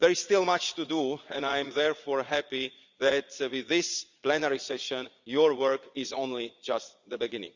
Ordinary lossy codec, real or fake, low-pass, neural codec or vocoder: Opus, 64 kbps; fake; 7.2 kHz; vocoder, 22.05 kHz, 80 mel bands, Vocos